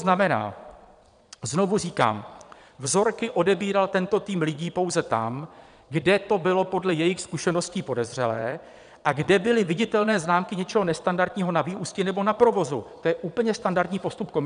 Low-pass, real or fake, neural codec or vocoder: 9.9 kHz; fake; vocoder, 22.05 kHz, 80 mel bands, Vocos